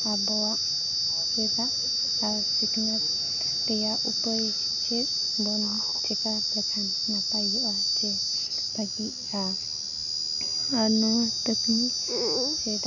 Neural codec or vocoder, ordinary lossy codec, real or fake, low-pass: none; none; real; 7.2 kHz